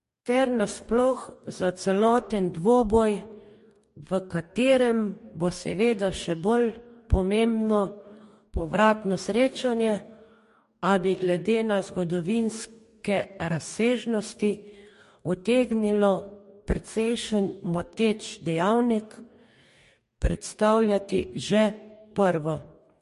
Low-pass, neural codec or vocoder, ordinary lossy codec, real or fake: 14.4 kHz; codec, 44.1 kHz, 2.6 kbps, DAC; MP3, 48 kbps; fake